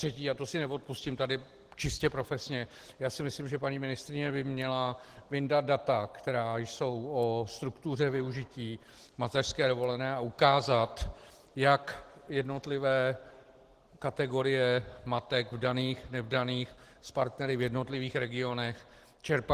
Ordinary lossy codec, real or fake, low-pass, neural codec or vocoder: Opus, 16 kbps; real; 14.4 kHz; none